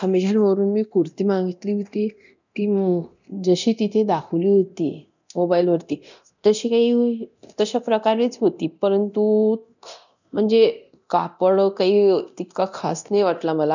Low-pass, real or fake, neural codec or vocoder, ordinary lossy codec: 7.2 kHz; fake; codec, 24 kHz, 0.9 kbps, DualCodec; none